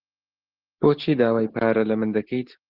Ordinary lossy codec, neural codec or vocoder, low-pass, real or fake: Opus, 16 kbps; none; 5.4 kHz; real